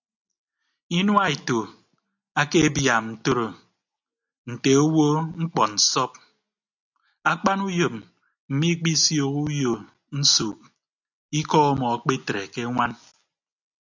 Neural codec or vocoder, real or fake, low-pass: none; real; 7.2 kHz